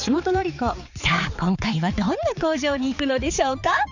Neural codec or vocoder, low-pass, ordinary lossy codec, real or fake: codec, 16 kHz, 4 kbps, X-Codec, HuBERT features, trained on balanced general audio; 7.2 kHz; none; fake